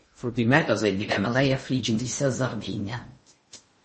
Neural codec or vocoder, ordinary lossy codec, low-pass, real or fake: codec, 16 kHz in and 24 kHz out, 0.6 kbps, FocalCodec, streaming, 2048 codes; MP3, 32 kbps; 10.8 kHz; fake